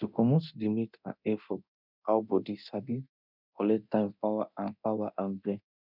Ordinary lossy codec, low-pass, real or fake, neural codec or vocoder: none; 5.4 kHz; fake; codec, 24 kHz, 0.9 kbps, DualCodec